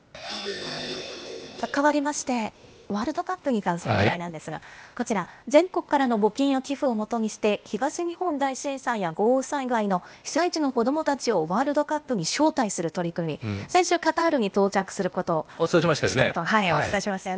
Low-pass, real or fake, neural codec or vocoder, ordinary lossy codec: none; fake; codec, 16 kHz, 0.8 kbps, ZipCodec; none